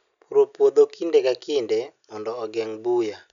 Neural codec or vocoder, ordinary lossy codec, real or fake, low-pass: none; none; real; 7.2 kHz